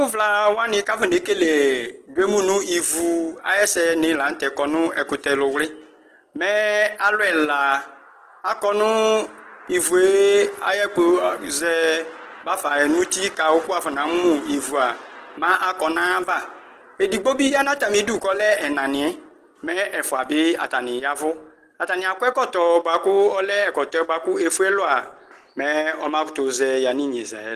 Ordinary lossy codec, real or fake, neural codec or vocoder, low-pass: Opus, 16 kbps; real; none; 14.4 kHz